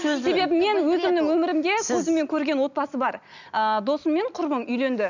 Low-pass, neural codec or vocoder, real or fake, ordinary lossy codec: 7.2 kHz; none; real; none